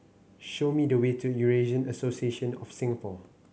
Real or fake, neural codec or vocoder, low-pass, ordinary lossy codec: real; none; none; none